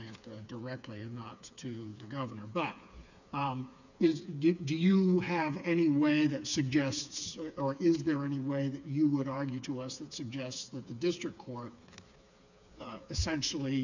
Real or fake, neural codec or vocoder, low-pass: fake; codec, 16 kHz, 4 kbps, FreqCodec, smaller model; 7.2 kHz